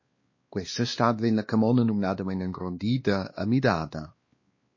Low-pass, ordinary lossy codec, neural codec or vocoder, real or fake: 7.2 kHz; MP3, 32 kbps; codec, 16 kHz, 2 kbps, X-Codec, WavLM features, trained on Multilingual LibriSpeech; fake